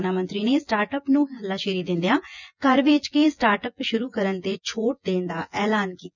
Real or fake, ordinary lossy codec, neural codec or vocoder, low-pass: fake; none; vocoder, 24 kHz, 100 mel bands, Vocos; 7.2 kHz